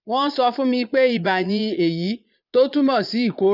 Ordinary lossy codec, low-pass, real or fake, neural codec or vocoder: none; 5.4 kHz; fake; vocoder, 22.05 kHz, 80 mel bands, Vocos